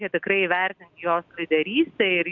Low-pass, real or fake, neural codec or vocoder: 7.2 kHz; real; none